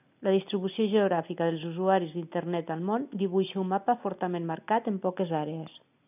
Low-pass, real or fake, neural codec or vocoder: 3.6 kHz; real; none